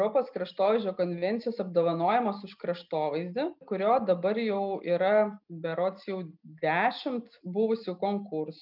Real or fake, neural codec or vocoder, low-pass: real; none; 5.4 kHz